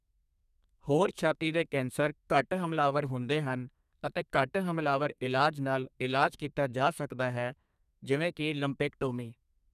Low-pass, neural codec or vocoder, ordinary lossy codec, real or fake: 14.4 kHz; codec, 32 kHz, 1.9 kbps, SNAC; none; fake